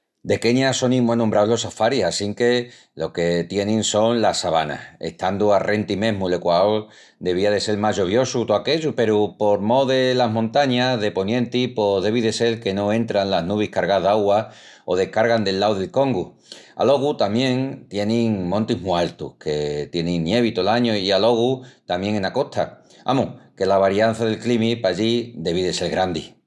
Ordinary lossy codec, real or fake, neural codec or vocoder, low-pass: none; real; none; none